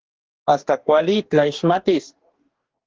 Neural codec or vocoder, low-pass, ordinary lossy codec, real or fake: codec, 44.1 kHz, 2.6 kbps, DAC; 7.2 kHz; Opus, 16 kbps; fake